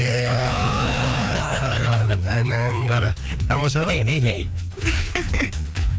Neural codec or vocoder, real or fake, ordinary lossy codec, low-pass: codec, 16 kHz, 2 kbps, FreqCodec, larger model; fake; none; none